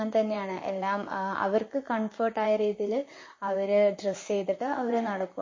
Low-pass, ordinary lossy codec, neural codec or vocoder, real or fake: 7.2 kHz; MP3, 32 kbps; vocoder, 44.1 kHz, 128 mel bands, Pupu-Vocoder; fake